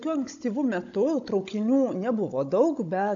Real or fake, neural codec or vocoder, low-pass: fake; codec, 16 kHz, 16 kbps, FunCodec, trained on Chinese and English, 50 frames a second; 7.2 kHz